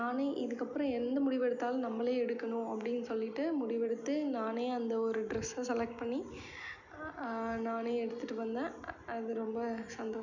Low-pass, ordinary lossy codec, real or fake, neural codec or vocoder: 7.2 kHz; none; real; none